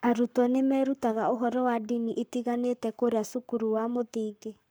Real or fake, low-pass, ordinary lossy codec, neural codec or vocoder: fake; none; none; codec, 44.1 kHz, 7.8 kbps, Pupu-Codec